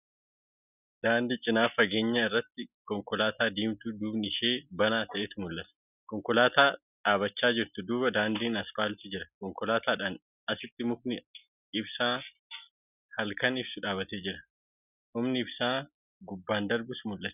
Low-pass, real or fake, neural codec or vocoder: 3.6 kHz; real; none